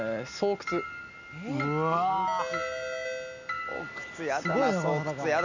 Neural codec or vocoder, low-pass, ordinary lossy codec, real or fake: none; 7.2 kHz; none; real